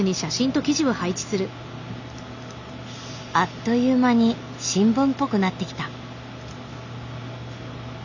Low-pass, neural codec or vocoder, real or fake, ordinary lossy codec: 7.2 kHz; none; real; none